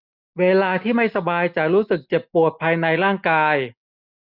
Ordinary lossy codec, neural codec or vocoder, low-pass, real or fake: none; none; 5.4 kHz; real